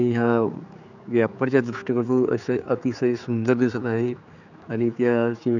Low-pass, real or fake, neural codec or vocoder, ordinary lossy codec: 7.2 kHz; fake; codec, 16 kHz, 4 kbps, X-Codec, HuBERT features, trained on general audio; none